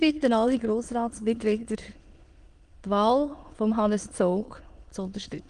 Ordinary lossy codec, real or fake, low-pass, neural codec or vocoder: Opus, 24 kbps; fake; 9.9 kHz; autoencoder, 22.05 kHz, a latent of 192 numbers a frame, VITS, trained on many speakers